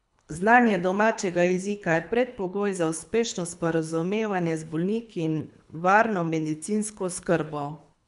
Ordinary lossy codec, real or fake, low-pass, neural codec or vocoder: none; fake; 10.8 kHz; codec, 24 kHz, 3 kbps, HILCodec